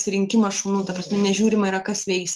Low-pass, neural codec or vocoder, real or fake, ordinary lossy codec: 14.4 kHz; none; real; Opus, 24 kbps